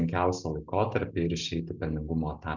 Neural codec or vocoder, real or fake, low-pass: none; real; 7.2 kHz